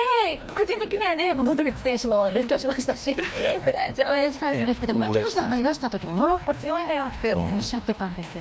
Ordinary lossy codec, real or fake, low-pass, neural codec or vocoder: none; fake; none; codec, 16 kHz, 1 kbps, FreqCodec, larger model